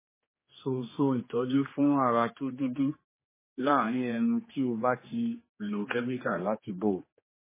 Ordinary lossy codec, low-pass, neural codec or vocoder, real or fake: MP3, 16 kbps; 3.6 kHz; codec, 16 kHz, 2 kbps, X-Codec, HuBERT features, trained on general audio; fake